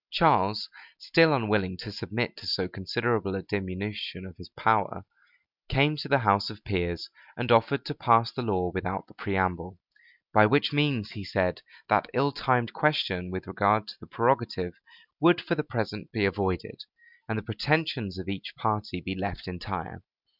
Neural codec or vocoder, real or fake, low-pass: none; real; 5.4 kHz